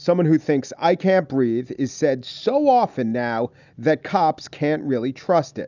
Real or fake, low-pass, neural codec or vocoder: real; 7.2 kHz; none